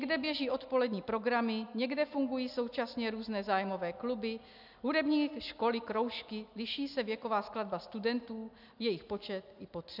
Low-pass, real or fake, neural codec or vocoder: 5.4 kHz; real; none